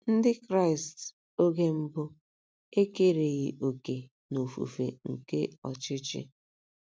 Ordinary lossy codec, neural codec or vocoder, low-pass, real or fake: none; none; none; real